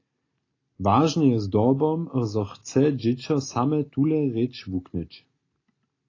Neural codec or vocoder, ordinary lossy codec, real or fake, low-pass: none; AAC, 32 kbps; real; 7.2 kHz